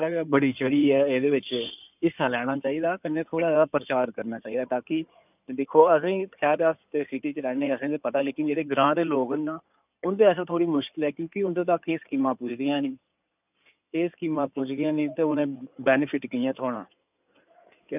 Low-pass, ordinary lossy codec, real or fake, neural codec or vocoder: 3.6 kHz; none; fake; codec, 16 kHz in and 24 kHz out, 2.2 kbps, FireRedTTS-2 codec